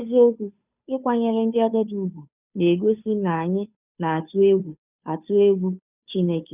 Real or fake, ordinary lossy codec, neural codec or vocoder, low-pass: fake; none; codec, 16 kHz, 2 kbps, FunCodec, trained on Chinese and English, 25 frames a second; 3.6 kHz